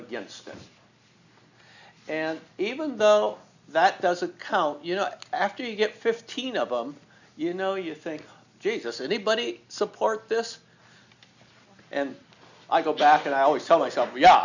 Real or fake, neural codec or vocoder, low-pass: real; none; 7.2 kHz